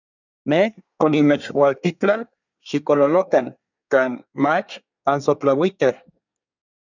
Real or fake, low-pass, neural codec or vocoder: fake; 7.2 kHz; codec, 24 kHz, 1 kbps, SNAC